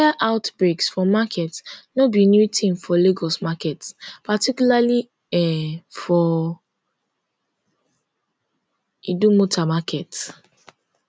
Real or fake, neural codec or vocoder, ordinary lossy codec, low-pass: real; none; none; none